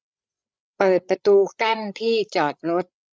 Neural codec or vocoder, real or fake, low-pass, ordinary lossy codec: codec, 16 kHz, 8 kbps, FreqCodec, larger model; fake; none; none